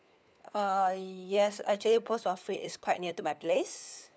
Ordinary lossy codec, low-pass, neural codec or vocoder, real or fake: none; none; codec, 16 kHz, 4 kbps, FunCodec, trained on LibriTTS, 50 frames a second; fake